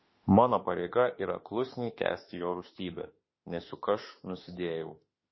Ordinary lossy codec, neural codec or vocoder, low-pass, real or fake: MP3, 24 kbps; autoencoder, 48 kHz, 32 numbers a frame, DAC-VAE, trained on Japanese speech; 7.2 kHz; fake